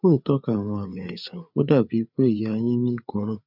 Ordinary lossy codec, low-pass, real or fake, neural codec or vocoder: none; 5.4 kHz; fake; codec, 16 kHz, 4 kbps, FreqCodec, larger model